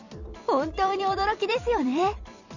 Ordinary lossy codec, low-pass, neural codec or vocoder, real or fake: none; 7.2 kHz; vocoder, 44.1 kHz, 128 mel bands every 256 samples, BigVGAN v2; fake